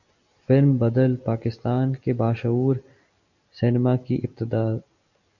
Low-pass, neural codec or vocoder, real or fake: 7.2 kHz; none; real